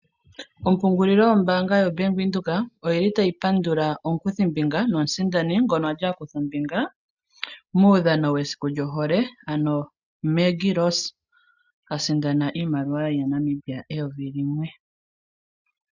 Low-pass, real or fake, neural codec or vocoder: 7.2 kHz; real; none